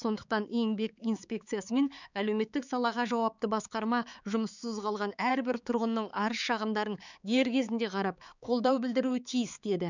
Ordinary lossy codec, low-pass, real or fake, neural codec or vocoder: none; 7.2 kHz; fake; codec, 16 kHz, 4 kbps, X-Codec, HuBERT features, trained on balanced general audio